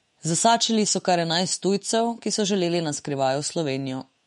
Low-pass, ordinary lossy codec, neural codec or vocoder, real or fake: 19.8 kHz; MP3, 48 kbps; autoencoder, 48 kHz, 128 numbers a frame, DAC-VAE, trained on Japanese speech; fake